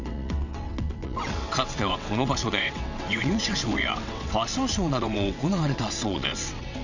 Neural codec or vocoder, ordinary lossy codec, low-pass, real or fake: vocoder, 22.05 kHz, 80 mel bands, WaveNeXt; none; 7.2 kHz; fake